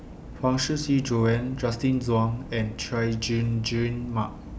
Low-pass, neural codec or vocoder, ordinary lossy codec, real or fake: none; none; none; real